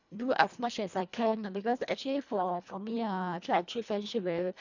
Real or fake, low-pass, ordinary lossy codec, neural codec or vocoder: fake; 7.2 kHz; none; codec, 24 kHz, 1.5 kbps, HILCodec